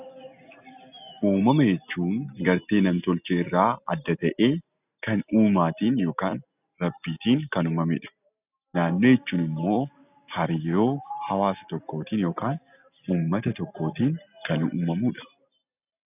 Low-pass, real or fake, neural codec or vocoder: 3.6 kHz; real; none